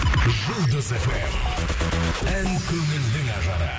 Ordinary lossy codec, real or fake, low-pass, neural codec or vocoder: none; real; none; none